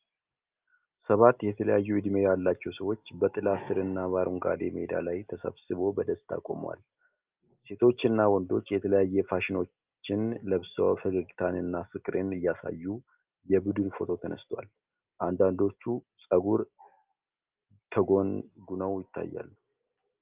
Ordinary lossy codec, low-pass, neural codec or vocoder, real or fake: Opus, 24 kbps; 3.6 kHz; none; real